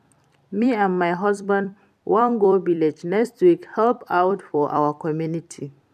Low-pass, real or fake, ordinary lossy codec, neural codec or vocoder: 14.4 kHz; fake; none; vocoder, 44.1 kHz, 128 mel bands every 256 samples, BigVGAN v2